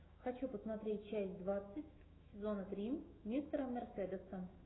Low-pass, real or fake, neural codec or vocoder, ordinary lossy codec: 7.2 kHz; fake; autoencoder, 48 kHz, 128 numbers a frame, DAC-VAE, trained on Japanese speech; AAC, 16 kbps